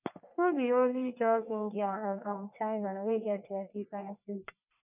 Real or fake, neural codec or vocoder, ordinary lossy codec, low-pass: fake; codec, 44.1 kHz, 1.7 kbps, Pupu-Codec; none; 3.6 kHz